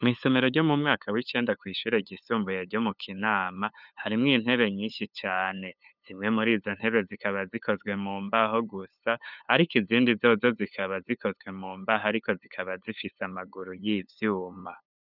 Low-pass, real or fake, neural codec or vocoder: 5.4 kHz; fake; codec, 16 kHz, 8 kbps, FunCodec, trained on LibriTTS, 25 frames a second